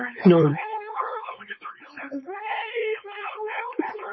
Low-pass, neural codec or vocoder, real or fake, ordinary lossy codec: 7.2 kHz; codec, 16 kHz, 4.8 kbps, FACodec; fake; MP3, 24 kbps